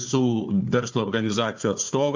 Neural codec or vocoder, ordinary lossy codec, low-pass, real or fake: codec, 16 kHz, 4 kbps, FunCodec, trained on Chinese and English, 50 frames a second; MP3, 64 kbps; 7.2 kHz; fake